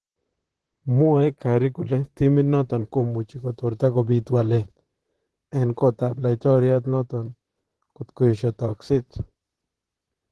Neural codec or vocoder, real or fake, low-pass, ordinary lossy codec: vocoder, 44.1 kHz, 128 mel bands, Pupu-Vocoder; fake; 10.8 kHz; Opus, 16 kbps